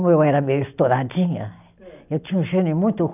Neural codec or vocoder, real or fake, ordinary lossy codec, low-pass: none; real; none; 3.6 kHz